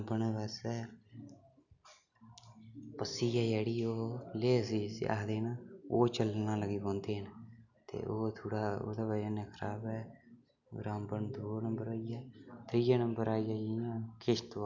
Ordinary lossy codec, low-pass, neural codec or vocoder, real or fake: none; 7.2 kHz; none; real